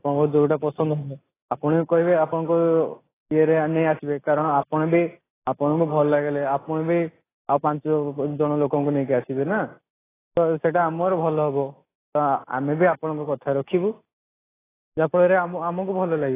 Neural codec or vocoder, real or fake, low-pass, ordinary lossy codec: none; real; 3.6 kHz; AAC, 16 kbps